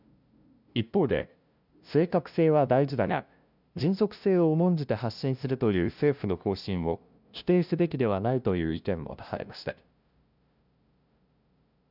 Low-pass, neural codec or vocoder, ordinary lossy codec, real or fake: 5.4 kHz; codec, 16 kHz, 0.5 kbps, FunCodec, trained on LibriTTS, 25 frames a second; none; fake